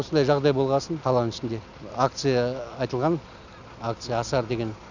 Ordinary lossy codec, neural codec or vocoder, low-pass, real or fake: none; none; 7.2 kHz; real